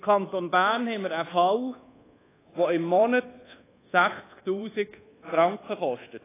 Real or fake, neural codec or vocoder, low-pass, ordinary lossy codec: fake; codec, 24 kHz, 1.2 kbps, DualCodec; 3.6 kHz; AAC, 16 kbps